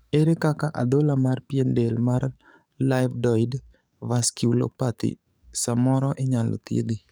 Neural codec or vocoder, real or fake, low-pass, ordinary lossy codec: codec, 44.1 kHz, 7.8 kbps, DAC; fake; none; none